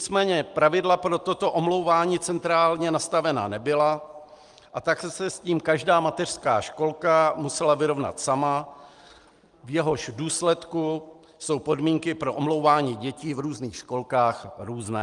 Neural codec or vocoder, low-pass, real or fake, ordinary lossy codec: none; 10.8 kHz; real; Opus, 32 kbps